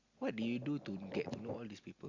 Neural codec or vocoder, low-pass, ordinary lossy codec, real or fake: none; 7.2 kHz; Opus, 64 kbps; real